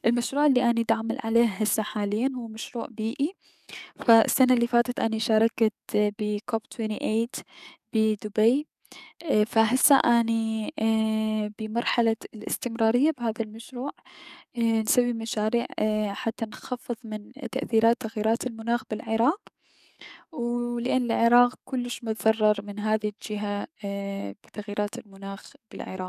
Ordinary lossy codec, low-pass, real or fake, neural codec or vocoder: none; 14.4 kHz; fake; codec, 44.1 kHz, 7.8 kbps, DAC